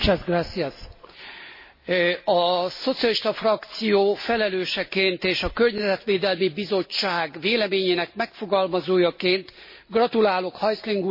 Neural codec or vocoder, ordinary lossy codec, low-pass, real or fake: none; MP3, 24 kbps; 5.4 kHz; real